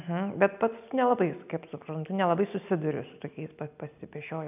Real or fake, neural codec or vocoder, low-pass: real; none; 3.6 kHz